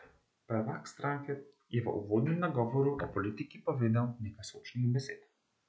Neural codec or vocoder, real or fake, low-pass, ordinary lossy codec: none; real; none; none